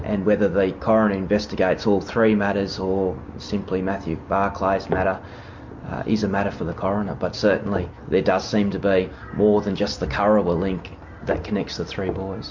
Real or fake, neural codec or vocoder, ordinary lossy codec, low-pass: real; none; MP3, 48 kbps; 7.2 kHz